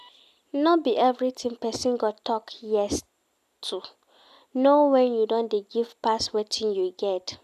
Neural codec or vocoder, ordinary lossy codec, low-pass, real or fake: none; none; 14.4 kHz; real